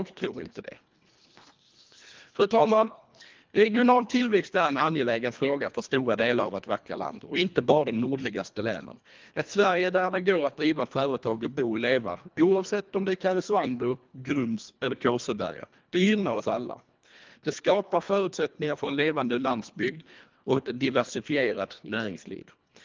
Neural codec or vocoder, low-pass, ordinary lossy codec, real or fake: codec, 24 kHz, 1.5 kbps, HILCodec; 7.2 kHz; Opus, 32 kbps; fake